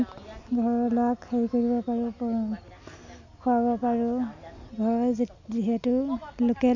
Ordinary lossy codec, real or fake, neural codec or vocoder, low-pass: none; real; none; 7.2 kHz